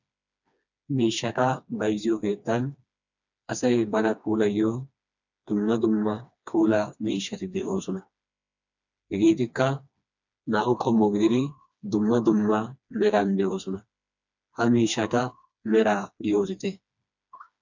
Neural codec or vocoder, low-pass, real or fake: codec, 16 kHz, 2 kbps, FreqCodec, smaller model; 7.2 kHz; fake